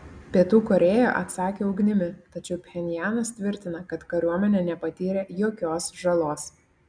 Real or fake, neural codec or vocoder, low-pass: real; none; 9.9 kHz